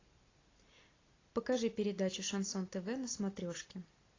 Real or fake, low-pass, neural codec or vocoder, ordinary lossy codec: real; 7.2 kHz; none; AAC, 32 kbps